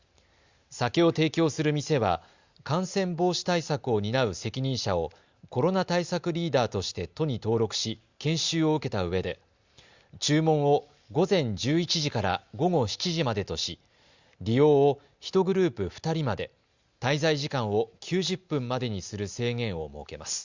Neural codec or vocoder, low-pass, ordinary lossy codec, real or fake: none; 7.2 kHz; Opus, 64 kbps; real